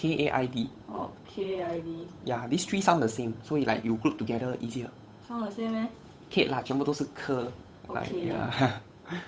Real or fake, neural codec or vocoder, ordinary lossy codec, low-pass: fake; codec, 16 kHz, 8 kbps, FunCodec, trained on Chinese and English, 25 frames a second; none; none